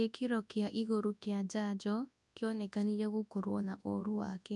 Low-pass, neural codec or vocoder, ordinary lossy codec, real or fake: 10.8 kHz; codec, 24 kHz, 0.9 kbps, WavTokenizer, large speech release; none; fake